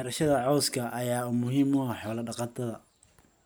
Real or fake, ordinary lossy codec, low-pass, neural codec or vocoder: real; none; none; none